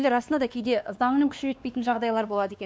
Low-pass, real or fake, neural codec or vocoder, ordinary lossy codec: none; fake; codec, 16 kHz, 2 kbps, X-Codec, WavLM features, trained on Multilingual LibriSpeech; none